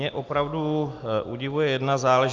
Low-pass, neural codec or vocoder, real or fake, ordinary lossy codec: 7.2 kHz; none; real; Opus, 24 kbps